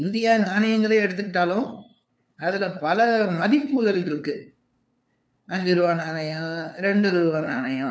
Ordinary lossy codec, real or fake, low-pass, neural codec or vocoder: none; fake; none; codec, 16 kHz, 2 kbps, FunCodec, trained on LibriTTS, 25 frames a second